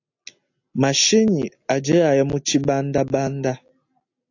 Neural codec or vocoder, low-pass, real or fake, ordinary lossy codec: none; 7.2 kHz; real; AAC, 48 kbps